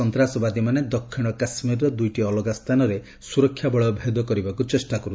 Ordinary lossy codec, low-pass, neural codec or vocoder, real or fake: none; none; none; real